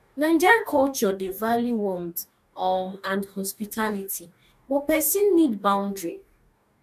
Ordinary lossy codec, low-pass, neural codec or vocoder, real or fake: none; 14.4 kHz; codec, 44.1 kHz, 2.6 kbps, DAC; fake